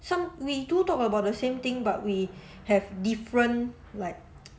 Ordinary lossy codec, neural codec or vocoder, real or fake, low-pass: none; none; real; none